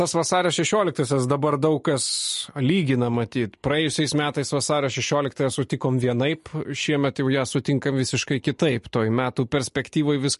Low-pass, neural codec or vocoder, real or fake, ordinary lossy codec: 14.4 kHz; none; real; MP3, 48 kbps